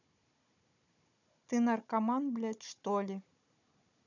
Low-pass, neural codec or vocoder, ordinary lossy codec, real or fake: 7.2 kHz; codec, 16 kHz, 16 kbps, FunCodec, trained on Chinese and English, 50 frames a second; none; fake